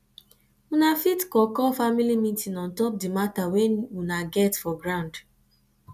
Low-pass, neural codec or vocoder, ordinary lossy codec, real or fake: 14.4 kHz; none; none; real